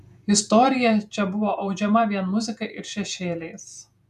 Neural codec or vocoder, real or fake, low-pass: vocoder, 48 kHz, 128 mel bands, Vocos; fake; 14.4 kHz